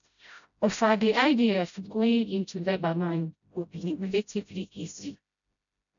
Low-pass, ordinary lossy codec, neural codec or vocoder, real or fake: 7.2 kHz; none; codec, 16 kHz, 0.5 kbps, FreqCodec, smaller model; fake